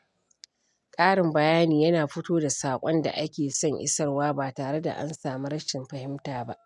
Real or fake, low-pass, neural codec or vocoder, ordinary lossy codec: real; 10.8 kHz; none; none